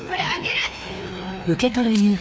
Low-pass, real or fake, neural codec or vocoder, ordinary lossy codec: none; fake; codec, 16 kHz, 2 kbps, FreqCodec, larger model; none